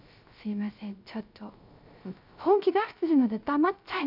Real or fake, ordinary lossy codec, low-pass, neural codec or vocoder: fake; none; 5.4 kHz; codec, 16 kHz, 0.3 kbps, FocalCodec